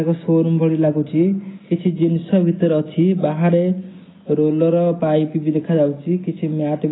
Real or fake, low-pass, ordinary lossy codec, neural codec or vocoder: real; 7.2 kHz; AAC, 16 kbps; none